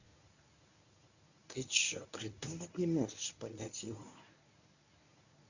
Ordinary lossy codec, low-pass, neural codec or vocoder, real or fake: none; 7.2 kHz; codec, 24 kHz, 0.9 kbps, WavTokenizer, medium speech release version 1; fake